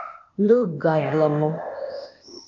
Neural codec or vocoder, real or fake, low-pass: codec, 16 kHz, 0.8 kbps, ZipCodec; fake; 7.2 kHz